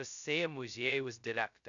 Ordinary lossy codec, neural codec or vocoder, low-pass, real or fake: MP3, 96 kbps; codec, 16 kHz, 0.2 kbps, FocalCodec; 7.2 kHz; fake